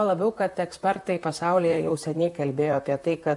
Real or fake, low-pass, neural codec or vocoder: fake; 10.8 kHz; vocoder, 44.1 kHz, 128 mel bands, Pupu-Vocoder